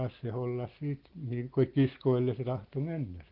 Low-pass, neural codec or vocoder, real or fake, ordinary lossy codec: 5.4 kHz; none; real; Opus, 16 kbps